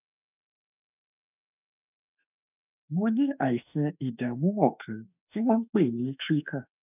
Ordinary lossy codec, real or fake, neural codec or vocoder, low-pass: none; fake; autoencoder, 48 kHz, 32 numbers a frame, DAC-VAE, trained on Japanese speech; 3.6 kHz